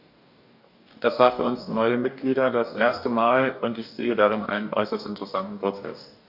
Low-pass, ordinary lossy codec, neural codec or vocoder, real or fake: 5.4 kHz; none; codec, 44.1 kHz, 2.6 kbps, DAC; fake